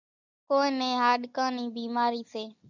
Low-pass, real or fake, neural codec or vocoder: 7.2 kHz; real; none